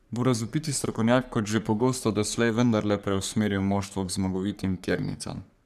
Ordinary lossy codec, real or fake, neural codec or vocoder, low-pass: none; fake; codec, 44.1 kHz, 3.4 kbps, Pupu-Codec; 14.4 kHz